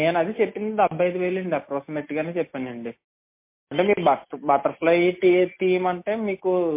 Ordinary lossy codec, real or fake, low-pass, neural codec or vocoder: MP3, 24 kbps; real; 3.6 kHz; none